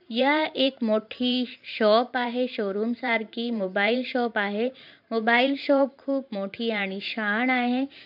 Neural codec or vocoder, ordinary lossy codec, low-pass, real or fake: vocoder, 44.1 kHz, 128 mel bands every 512 samples, BigVGAN v2; none; 5.4 kHz; fake